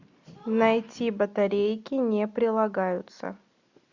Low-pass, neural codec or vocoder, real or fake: 7.2 kHz; none; real